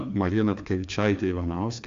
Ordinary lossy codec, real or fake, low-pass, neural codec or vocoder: AAC, 64 kbps; fake; 7.2 kHz; codec, 16 kHz, 2 kbps, FreqCodec, larger model